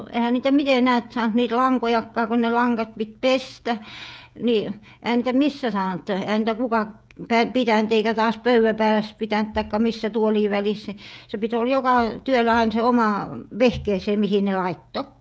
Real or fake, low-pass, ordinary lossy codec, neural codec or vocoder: fake; none; none; codec, 16 kHz, 16 kbps, FreqCodec, smaller model